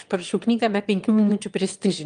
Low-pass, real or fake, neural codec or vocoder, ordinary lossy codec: 9.9 kHz; fake; autoencoder, 22.05 kHz, a latent of 192 numbers a frame, VITS, trained on one speaker; Opus, 32 kbps